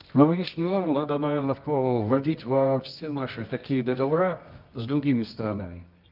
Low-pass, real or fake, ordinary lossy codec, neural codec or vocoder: 5.4 kHz; fake; Opus, 24 kbps; codec, 24 kHz, 0.9 kbps, WavTokenizer, medium music audio release